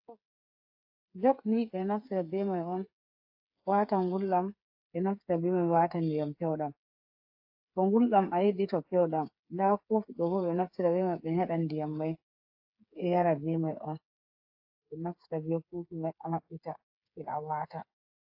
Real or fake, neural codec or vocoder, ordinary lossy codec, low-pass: fake; codec, 16 kHz, 8 kbps, FreqCodec, smaller model; AAC, 48 kbps; 5.4 kHz